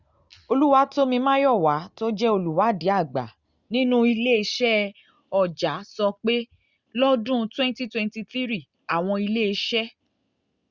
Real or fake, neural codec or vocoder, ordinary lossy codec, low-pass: real; none; Opus, 64 kbps; 7.2 kHz